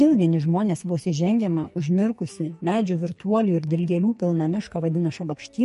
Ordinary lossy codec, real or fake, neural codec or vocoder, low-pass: MP3, 48 kbps; fake; codec, 44.1 kHz, 2.6 kbps, SNAC; 14.4 kHz